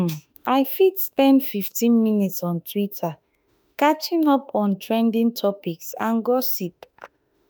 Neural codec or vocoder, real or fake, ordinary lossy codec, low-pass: autoencoder, 48 kHz, 32 numbers a frame, DAC-VAE, trained on Japanese speech; fake; none; none